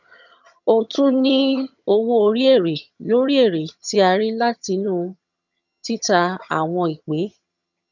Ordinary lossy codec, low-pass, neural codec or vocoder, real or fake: none; 7.2 kHz; vocoder, 22.05 kHz, 80 mel bands, HiFi-GAN; fake